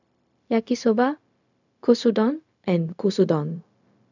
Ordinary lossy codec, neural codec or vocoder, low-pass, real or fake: none; codec, 16 kHz, 0.4 kbps, LongCat-Audio-Codec; 7.2 kHz; fake